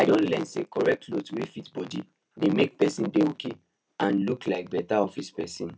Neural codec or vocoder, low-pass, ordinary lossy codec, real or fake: none; none; none; real